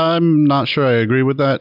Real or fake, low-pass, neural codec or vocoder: real; 5.4 kHz; none